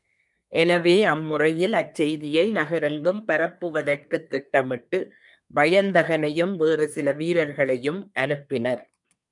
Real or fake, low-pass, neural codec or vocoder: fake; 10.8 kHz; codec, 24 kHz, 1 kbps, SNAC